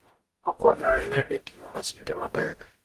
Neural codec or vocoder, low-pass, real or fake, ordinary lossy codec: codec, 44.1 kHz, 0.9 kbps, DAC; 14.4 kHz; fake; Opus, 24 kbps